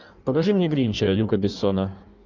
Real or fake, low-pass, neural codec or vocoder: fake; 7.2 kHz; codec, 16 kHz in and 24 kHz out, 1.1 kbps, FireRedTTS-2 codec